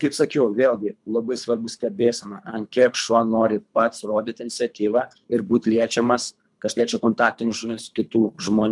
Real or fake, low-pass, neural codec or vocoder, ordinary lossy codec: fake; 10.8 kHz; codec, 24 kHz, 3 kbps, HILCodec; MP3, 96 kbps